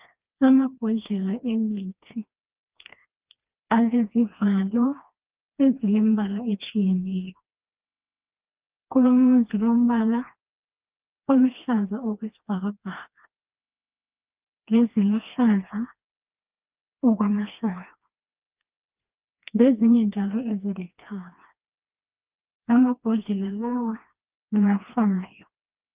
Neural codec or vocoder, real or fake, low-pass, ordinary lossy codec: codec, 16 kHz, 2 kbps, FreqCodec, smaller model; fake; 3.6 kHz; Opus, 24 kbps